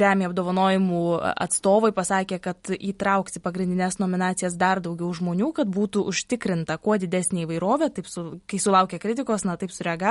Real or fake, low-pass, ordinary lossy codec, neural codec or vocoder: real; 19.8 kHz; MP3, 48 kbps; none